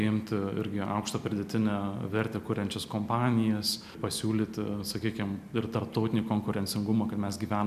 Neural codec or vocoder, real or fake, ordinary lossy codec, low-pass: none; real; MP3, 96 kbps; 14.4 kHz